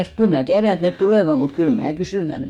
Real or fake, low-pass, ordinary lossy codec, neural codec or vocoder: fake; 19.8 kHz; none; codec, 44.1 kHz, 2.6 kbps, DAC